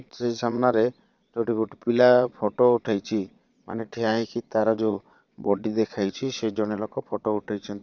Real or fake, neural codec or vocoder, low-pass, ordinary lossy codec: fake; vocoder, 22.05 kHz, 80 mel bands, Vocos; 7.2 kHz; none